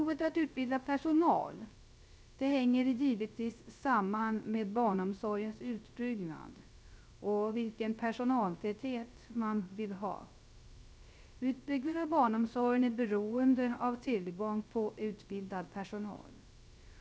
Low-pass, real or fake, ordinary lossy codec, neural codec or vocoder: none; fake; none; codec, 16 kHz, 0.3 kbps, FocalCodec